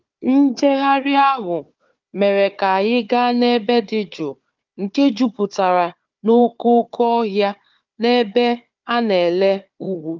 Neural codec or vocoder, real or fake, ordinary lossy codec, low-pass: codec, 16 kHz, 4 kbps, FunCodec, trained on Chinese and English, 50 frames a second; fake; Opus, 32 kbps; 7.2 kHz